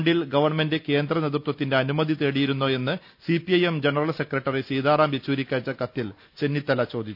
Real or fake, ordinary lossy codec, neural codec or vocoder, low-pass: real; none; none; 5.4 kHz